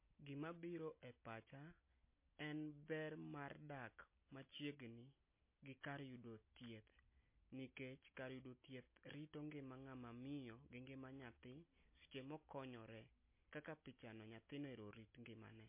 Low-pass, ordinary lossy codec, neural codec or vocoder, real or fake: 3.6 kHz; MP3, 24 kbps; none; real